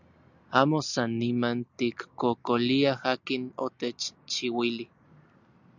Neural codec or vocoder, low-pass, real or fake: none; 7.2 kHz; real